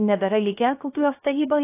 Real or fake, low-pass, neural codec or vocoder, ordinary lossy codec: fake; 3.6 kHz; codec, 16 kHz, 0.3 kbps, FocalCodec; AAC, 24 kbps